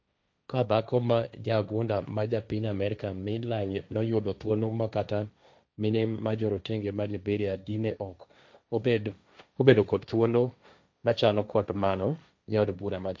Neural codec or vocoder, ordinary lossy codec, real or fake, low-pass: codec, 16 kHz, 1.1 kbps, Voila-Tokenizer; none; fake; none